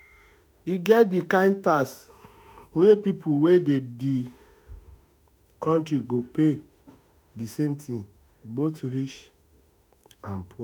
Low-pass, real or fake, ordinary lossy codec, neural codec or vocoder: none; fake; none; autoencoder, 48 kHz, 32 numbers a frame, DAC-VAE, trained on Japanese speech